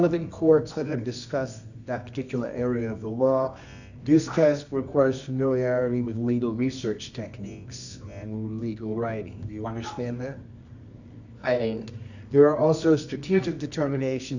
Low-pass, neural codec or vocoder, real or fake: 7.2 kHz; codec, 24 kHz, 0.9 kbps, WavTokenizer, medium music audio release; fake